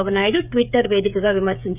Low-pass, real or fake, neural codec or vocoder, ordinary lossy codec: 3.6 kHz; fake; codec, 16 kHz, 8 kbps, FreqCodec, smaller model; none